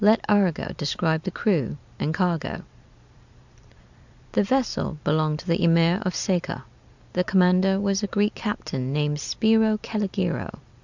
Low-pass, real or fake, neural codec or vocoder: 7.2 kHz; fake; vocoder, 44.1 kHz, 128 mel bands every 256 samples, BigVGAN v2